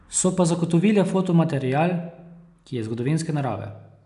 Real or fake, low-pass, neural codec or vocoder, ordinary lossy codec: real; 10.8 kHz; none; none